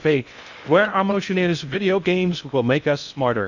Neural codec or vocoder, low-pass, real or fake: codec, 16 kHz in and 24 kHz out, 0.6 kbps, FocalCodec, streaming, 2048 codes; 7.2 kHz; fake